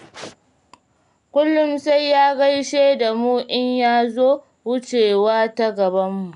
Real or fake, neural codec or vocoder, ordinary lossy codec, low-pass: real; none; none; 10.8 kHz